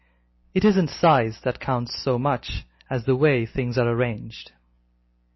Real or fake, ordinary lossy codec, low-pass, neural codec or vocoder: real; MP3, 24 kbps; 7.2 kHz; none